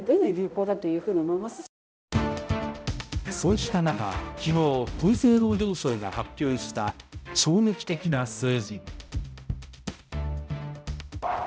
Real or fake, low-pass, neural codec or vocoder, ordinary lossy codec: fake; none; codec, 16 kHz, 0.5 kbps, X-Codec, HuBERT features, trained on balanced general audio; none